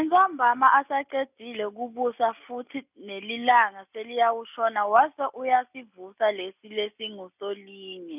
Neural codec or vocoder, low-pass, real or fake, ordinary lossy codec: none; 3.6 kHz; real; none